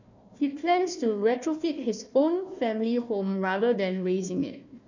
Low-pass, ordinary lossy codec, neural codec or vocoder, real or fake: 7.2 kHz; none; codec, 16 kHz, 1 kbps, FunCodec, trained on Chinese and English, 50 frames a second; fake